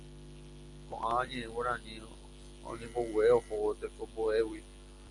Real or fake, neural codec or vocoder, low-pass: real; none; 10.8 kHz